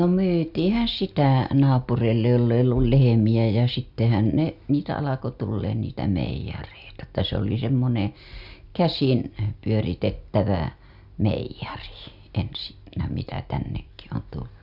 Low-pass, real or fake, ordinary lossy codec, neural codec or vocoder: 5.4 kHz; real; Opus, 64 kbps; none